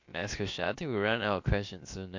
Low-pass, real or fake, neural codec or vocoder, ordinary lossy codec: 7.2 kHz; fake; codec, 16 kHz, about 1 kbps, DyCAST, with the encoder's durations; MP3, 48 kbps